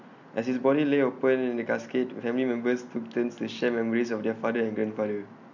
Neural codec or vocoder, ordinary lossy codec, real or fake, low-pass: none; none; real; 7.2 kHz